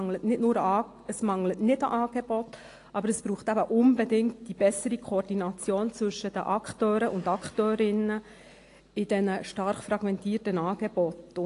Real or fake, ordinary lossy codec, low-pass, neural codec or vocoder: real; AAC, 48 kbps; 10.8 kHz; none